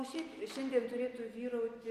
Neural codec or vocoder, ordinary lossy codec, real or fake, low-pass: none; Opus, 32 kbps; real; 14.4 kHz